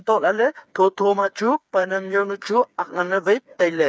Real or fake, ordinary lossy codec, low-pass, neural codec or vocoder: fake; none; none; codec, 16 kHz, 4 kbps, FreqCodec, smaller model